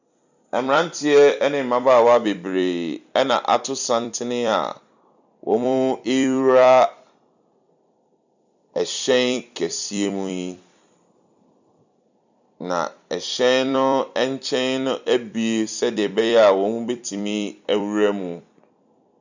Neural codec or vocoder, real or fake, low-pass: vocoder, 44.1 kHz, 128 mel bands every 256 samples, BigVGAN v2; fake; 7.2 kHz